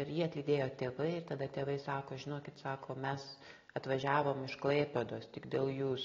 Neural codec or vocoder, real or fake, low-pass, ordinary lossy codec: none; real; 7.2 kHz; AAC, 32 kbps